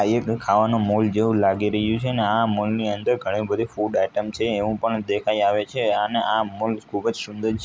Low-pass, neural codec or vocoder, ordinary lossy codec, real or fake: none; none; none; real